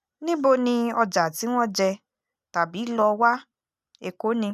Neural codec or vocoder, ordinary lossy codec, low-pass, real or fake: none; none; 14.4 kHz; real